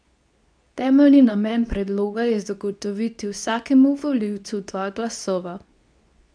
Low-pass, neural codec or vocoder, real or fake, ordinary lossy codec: 9.9 kHz; codec, 24 kHz, 0.9 kbps, WavTokenizer, medium speech release version 2; fake; none